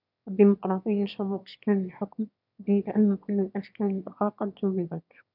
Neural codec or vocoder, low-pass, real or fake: autoencoder, 22.05 kHz, a latent of 192 numbers a frame, VITS, trained on one speaker; 5.4 kHz; fake